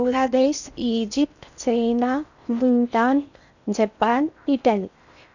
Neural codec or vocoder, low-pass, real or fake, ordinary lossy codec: codec, 16 kHz in and 24 kHz out, 0.6 kbps, FocalCodec, streaming, 4096 codes; 7.2 kHz; fake; none